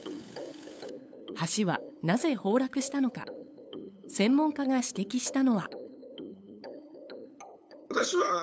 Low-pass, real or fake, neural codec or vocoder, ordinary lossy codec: none; fake; codec, 16 kHz, 8 kbps, FunCodec, trained on LibriTTS, 25 frames a second; none